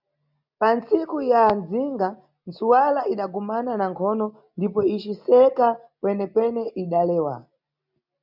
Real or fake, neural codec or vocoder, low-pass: real; none; 5.4 kHz